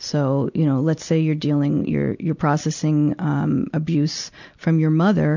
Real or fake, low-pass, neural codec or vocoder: real; 7.2 kHz; none